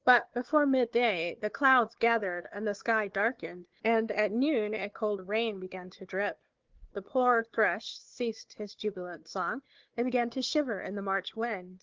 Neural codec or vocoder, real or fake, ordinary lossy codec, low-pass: codec, 16 kHz, 4 kbps, FunCodec, trained on Chinese and English, 50 frames a second; fake; Opus, 16 kbps; 7.2 kHz